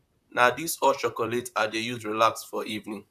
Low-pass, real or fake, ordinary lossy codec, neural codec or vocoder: 14.4 kHz; fake; none; vocoder, 44.1 kHz, 128 mel bands, Pupu-Vocoder